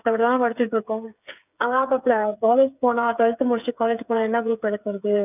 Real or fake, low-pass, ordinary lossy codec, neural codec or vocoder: fake; 3.6 kHz; none; codec, 16 kHz, 8 kbps, FreqCodec, smaller model